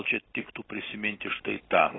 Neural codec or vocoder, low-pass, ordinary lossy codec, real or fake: none; 7.2 kHz; AAC, 16 kbps; real